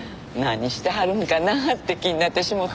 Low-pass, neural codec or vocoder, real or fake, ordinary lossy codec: none; none; real; none